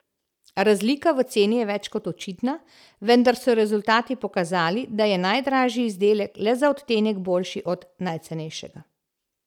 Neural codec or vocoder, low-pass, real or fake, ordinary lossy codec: none; 19.8 kHz; real; none